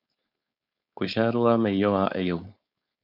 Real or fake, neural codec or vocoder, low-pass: fake; codec, 16 kHz, 4.8 kbps, FACodec; 5.4 kHz